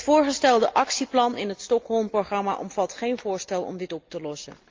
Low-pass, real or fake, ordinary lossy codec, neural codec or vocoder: 7.2 kHz; real; Opus, 32 kbps; none